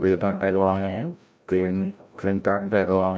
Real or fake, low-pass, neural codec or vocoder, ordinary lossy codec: fake; none; codec, 16 kHz, 0.5 kbps, FreqCodec, larger model; none